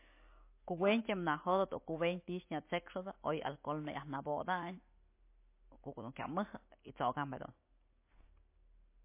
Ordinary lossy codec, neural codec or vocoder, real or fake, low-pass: MP3, 24 kbps; none; real; 3.6 kHz